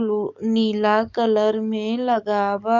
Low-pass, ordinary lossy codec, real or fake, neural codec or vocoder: 7.2 kHz; none; fake; codec, 44.1 kHz, 7.8 kbps, DAC